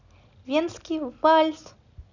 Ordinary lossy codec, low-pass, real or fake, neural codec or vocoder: none; 7.2 kHz; real; none